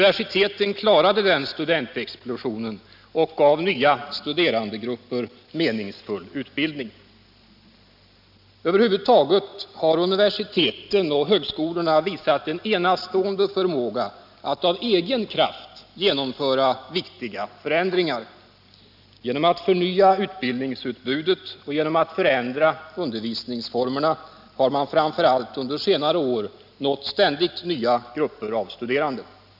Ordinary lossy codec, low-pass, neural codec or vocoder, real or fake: none; 5.4 kHz; none; real